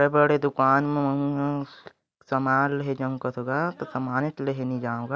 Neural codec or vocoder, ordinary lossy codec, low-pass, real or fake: none; none; none; real